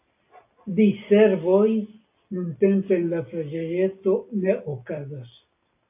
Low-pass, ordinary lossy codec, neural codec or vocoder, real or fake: 3.6 kHz; AAC, 32 kbps; none; real